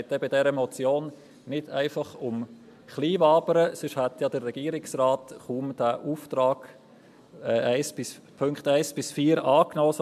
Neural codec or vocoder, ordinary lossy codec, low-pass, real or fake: none; MP3, 96 kbps; 14.4 kHz; real